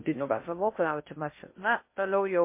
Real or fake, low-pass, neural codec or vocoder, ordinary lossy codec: fake; 3.6 kHz; codec, 16 kHz in and 24 kHz out, 0.6 kbps, FocalCodec, streaming, 2048 codes; MP3, 24 kbps